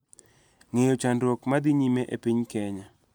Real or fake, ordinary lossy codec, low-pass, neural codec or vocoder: real; none; none; none